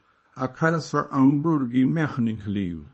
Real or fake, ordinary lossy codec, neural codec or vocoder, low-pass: fake; MP3, 32 kbps; codec, 24 kHz, 0.9 kbps, WavTokenizer, small release; 10.8 kHz